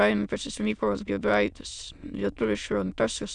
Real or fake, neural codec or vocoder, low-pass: fake; autoencoder, 22.05 kHz, a latent of 192 numbers a frame, VITS, trained on many speakers; 9.9 kHz